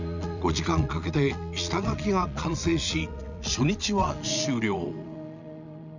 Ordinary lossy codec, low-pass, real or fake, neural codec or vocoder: none; 7.2 kHz; fake; vocoder, 22.05 kHz, 80 mel bands, Vocos